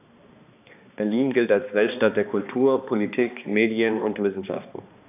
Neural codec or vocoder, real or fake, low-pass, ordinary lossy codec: codec, 16 kHz, 2 kbps, X-Codec, HuBERT features, trained on balanced general audio; fake; 3.6 kHz; AAC, 32 kbps